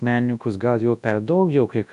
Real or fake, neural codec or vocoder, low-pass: fake; codec, 24 kHz, 0.9 kbps, WavTokenizer, large speech release; 10.8 kHz